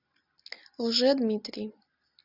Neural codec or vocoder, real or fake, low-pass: none; real; 5.4 kHz